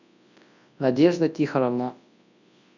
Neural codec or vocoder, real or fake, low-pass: codec, 24 kHz, 0.9 kbps, WavTokenizer, large speech release; fake; 7.2 kHz